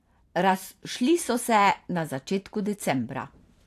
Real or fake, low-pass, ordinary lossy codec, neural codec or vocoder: fake; 14.4 kHz; AAC, 64 kbps; vocoder, 44.1 kHz, 128 mel bands every 512 samples, BigVGAN v2